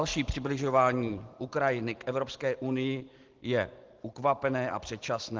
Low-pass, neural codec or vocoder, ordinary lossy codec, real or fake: 7.2 kHz; none; Opus, 16 kbps; real